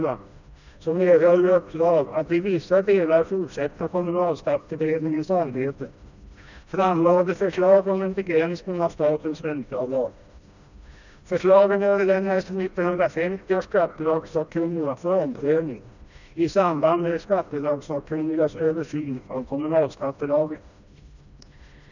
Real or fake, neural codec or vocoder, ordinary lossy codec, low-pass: fake; codec, 16 kHz, 1 kbps, FreqCodec, smaller model; none; 7.2 kHz